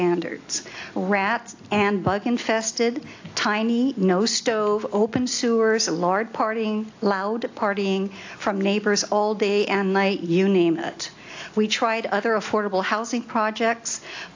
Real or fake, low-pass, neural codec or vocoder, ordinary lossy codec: real; 7.2 kHz; none; AAC, 48 kbps